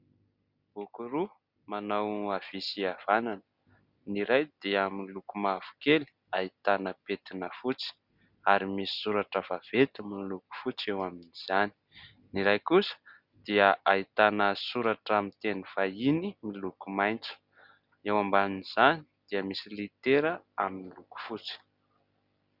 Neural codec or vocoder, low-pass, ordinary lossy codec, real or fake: none; 5.4 kHz; Opus, 64 kbps; real